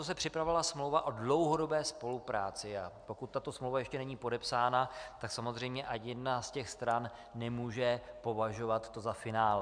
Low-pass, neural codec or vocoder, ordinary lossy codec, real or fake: 9.9 kHz; none; MP3, 96 kbps; real